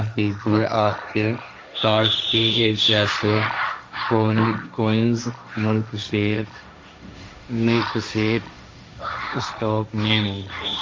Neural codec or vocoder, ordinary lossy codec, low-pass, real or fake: codec, 16 kHz, 1.1 kbps, Voila-Tokenizer; none; none; fake